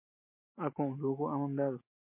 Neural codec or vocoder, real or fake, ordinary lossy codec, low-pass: none; real; MP3, 24 kbps; 3.6 kHz